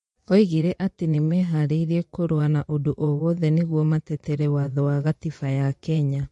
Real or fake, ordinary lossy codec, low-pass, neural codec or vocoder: fake; MP3, 48 kbps; 10.8 kHz; vocoder, 24 kHz, 100 mel bands, Vocos